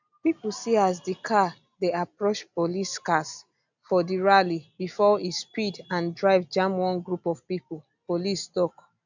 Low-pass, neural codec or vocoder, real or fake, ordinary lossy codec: 7.2 kHz; none; real; none